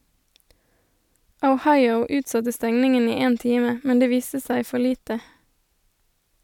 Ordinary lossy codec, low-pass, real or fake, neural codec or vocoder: none; 19.8 kHz; real; none